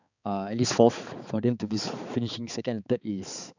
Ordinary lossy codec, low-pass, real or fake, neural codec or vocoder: none; 7.2 kHz; fake; codec, 16 kHz, 4 kbps, X-Codec, HuBERT features, trained on balanced general audio